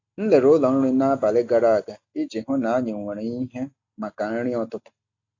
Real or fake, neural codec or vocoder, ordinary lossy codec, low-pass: real; none; AAC, 48 kbps; 7.2 kHz